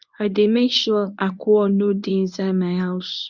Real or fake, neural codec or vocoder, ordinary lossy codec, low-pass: fake; codec, 24 kHz, 0.9 kbps, WavTokenizer, medium speech release version 1; none; 7.2 kHz